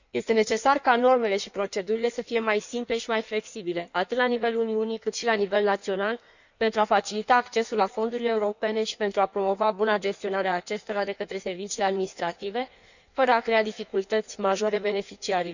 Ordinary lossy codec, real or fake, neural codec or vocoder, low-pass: none; fake; codec, 16 kHz in and 24 kHz out, 1.1 kbps, FireRedTTS-2 codec; 7.2 kHz